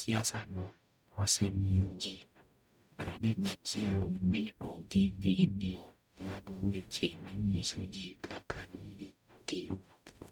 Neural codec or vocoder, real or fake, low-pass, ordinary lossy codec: codec, 44.1 kHz, 0.9 kbps, DAC; fake; 19.8 kHz; none